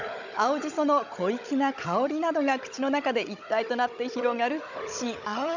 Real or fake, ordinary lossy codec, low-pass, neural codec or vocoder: fake; none; 7.2 kHz; codec, 16 kHz, 16 kbps, FunCodec, trained on Chinese and English, 50 frames a second